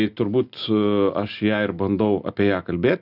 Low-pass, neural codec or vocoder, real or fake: 5.4 kHz; none; real